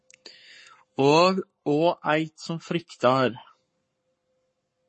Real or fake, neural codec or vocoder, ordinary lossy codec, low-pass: fake; codec, 44.1 kHz, 7.8 kbps, Pupu-Codec; MP3, 32 kbps; 10.8 kHz